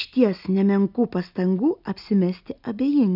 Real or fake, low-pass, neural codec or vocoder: real; 5.4 kHz; none